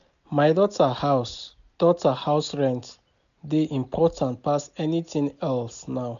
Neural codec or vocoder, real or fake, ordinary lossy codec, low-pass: none; real; MP3, 96 kbps; 7.2 kHz